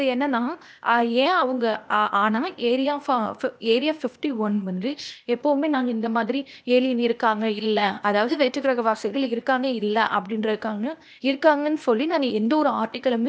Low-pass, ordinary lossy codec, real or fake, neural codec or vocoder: none; none; fake; codec, 16 kHz, 0.8 kbps, ZipCodec